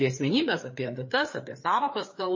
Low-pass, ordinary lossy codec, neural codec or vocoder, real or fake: 7.2 kHz; MP3, 32 kbps; codec, 16 kHz, 4 kbps, FreqCodec, larger model; fake